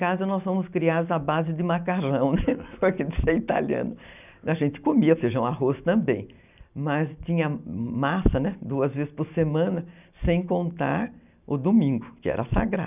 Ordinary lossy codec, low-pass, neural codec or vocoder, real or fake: none; 3.6 kHz; none; real